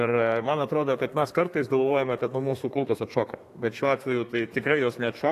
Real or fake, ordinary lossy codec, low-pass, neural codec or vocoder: fake; AAC, 64 kbps; 14.4 kHz; codec, 32 kHz, 1.9 kbps, SNAC